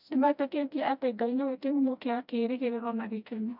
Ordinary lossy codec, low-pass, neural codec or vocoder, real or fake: none; 5.4 kHz; codec, 16 kHz, 1 kbps, FreqCodec, smaller model; fake